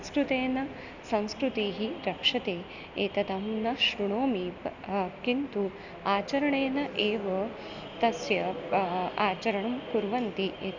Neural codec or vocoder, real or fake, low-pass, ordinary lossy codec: none; real; 7.2 kHz; none